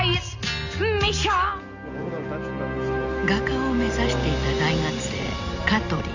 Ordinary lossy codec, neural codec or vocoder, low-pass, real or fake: none; none; 7.2 kHz; real